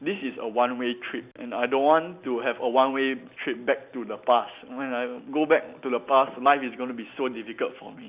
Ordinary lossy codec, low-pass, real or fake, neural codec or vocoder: Opus, 64 kbps; 3.6 kHz; real; none